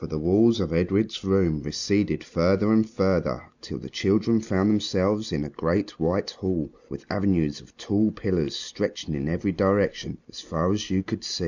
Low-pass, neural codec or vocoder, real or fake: 7.2 kHz; none; real